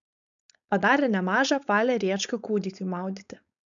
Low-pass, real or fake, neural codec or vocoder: 7.2 kHz; fake; codec, 16 kHz, 4.8 kbps, FACodec